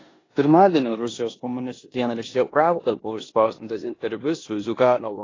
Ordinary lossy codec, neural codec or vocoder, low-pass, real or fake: AAC, 32 kbps; codec, 16 kHz in and 24 kHz out, 0.9 kbps, LongCat-Audio-Codec, four codebook decoder; 7.2 kHz; fake